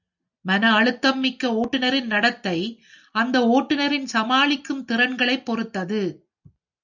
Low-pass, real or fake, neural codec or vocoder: 7.2 kHz; real; none